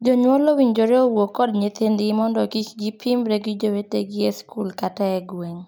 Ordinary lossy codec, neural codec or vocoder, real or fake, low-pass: none; none; real; none